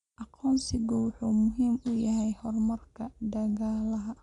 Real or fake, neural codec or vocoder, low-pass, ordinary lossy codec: real; none; 10.8 kHz; none